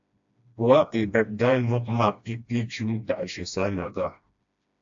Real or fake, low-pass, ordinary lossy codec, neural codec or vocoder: fake; 7.2 kHz; AAC, 64 kbps; codec, 16 kHz, 1 kbps, FreqCodec, smaller model